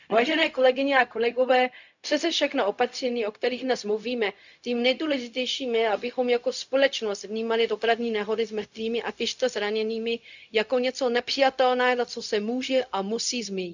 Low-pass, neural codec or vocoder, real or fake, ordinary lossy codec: 7.2 kHz; codec, 16 kHz, 0.4 kbps, LongCat-Audio-Codec; fake; none